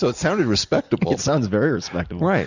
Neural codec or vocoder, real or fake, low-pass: none; real; 7.2 kHz